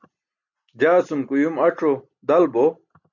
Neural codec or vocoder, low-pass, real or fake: none; 7.2 kHz; real